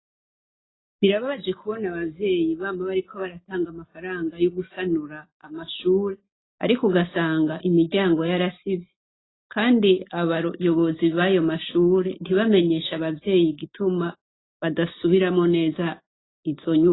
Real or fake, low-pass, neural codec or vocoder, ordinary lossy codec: real; 7.2 kHz; none; AAC, 16 kbps